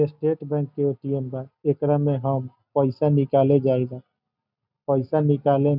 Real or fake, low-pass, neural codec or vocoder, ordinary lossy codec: real; 5.4 kHz; none; none